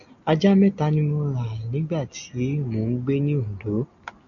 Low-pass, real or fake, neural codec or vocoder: 7.2 kHz; real; none